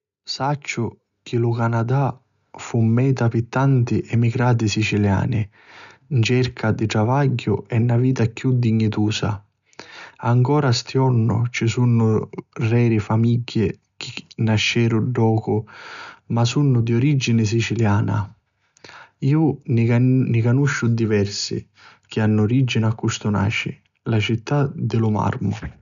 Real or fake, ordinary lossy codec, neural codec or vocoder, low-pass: real; none; none; 7.2 kHz